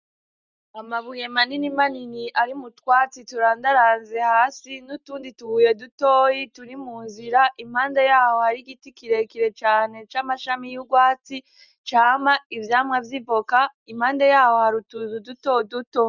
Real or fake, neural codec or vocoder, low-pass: real; none; 7.2 kHz